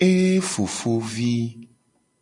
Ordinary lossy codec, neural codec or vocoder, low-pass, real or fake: MP3, 96 kbps; none; 10.8 kHz; real